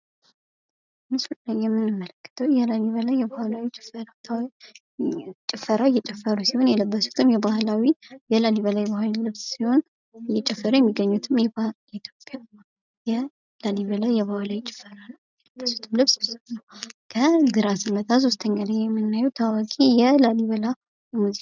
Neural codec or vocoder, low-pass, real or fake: none; 7.2 kHz; real